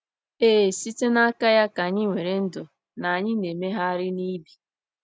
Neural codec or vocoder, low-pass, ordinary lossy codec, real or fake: none; none; none; real